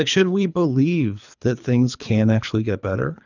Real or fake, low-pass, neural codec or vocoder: fake; 7.2 kHz; codec, 24 kHz, 3 kbps, HILCodec